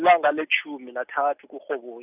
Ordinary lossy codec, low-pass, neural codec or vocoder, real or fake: none; 3.6 kHz; none; real